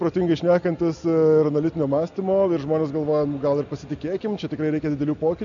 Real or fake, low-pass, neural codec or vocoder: real; 7.2 kHz; none